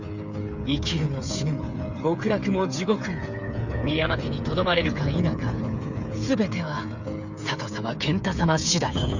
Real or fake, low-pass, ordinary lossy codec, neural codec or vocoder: fake; 7.2 kHz; none; codec, 16 kHz, 8 kbps, FreqCodec, smaller model